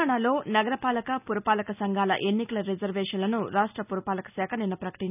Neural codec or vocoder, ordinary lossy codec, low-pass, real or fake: none; none; 3.6 kHz; real